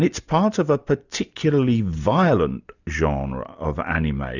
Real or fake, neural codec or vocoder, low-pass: real; none; 7.2 kHz